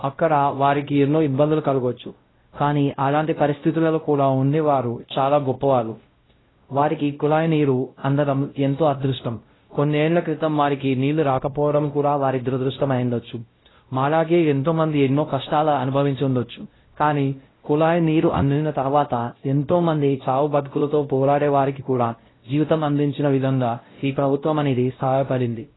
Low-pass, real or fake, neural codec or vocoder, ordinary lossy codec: 7.2 kHz; fake; codec, 16 kHz, 0.5 kbps, X-Codec, WavLM features, trained on Multilingual LibriSpeech; AAC, 16 kbps